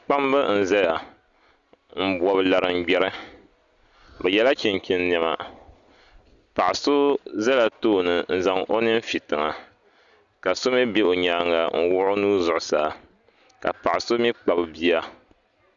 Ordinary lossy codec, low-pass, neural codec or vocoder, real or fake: Opus, 64 kbps; 7.2 kHz; none; real